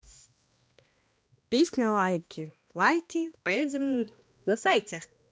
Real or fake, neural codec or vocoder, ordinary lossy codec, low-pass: fake; codec, 16 kHz, 1 kbps, X-Codec, HuBERT features, trained on balanced general audio; none; none